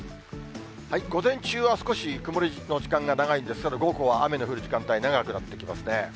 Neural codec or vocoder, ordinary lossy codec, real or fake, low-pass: none; none; real; none